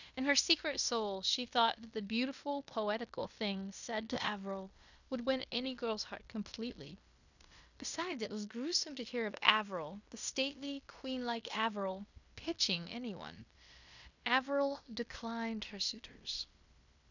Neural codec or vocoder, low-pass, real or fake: codec, 16 kHz in and 24 kHz out, 0.9 kbps, LongCat-Audio-Codec, fine tuned four codebook decoder; 7.2 kHz; fake